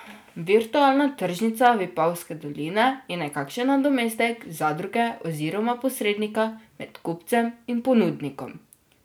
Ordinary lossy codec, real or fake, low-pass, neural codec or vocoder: none; real; none; none